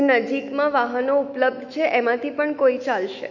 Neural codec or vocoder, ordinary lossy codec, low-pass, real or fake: autoencoder, 48 kHz, 128 numbers a frame, DAC-VAE, trained on Japanese speech; none; 7.2 kHz; fake